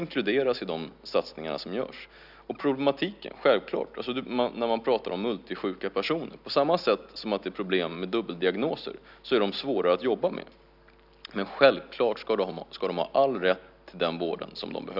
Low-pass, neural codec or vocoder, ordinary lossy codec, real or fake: 5.4 kHz; none; none; real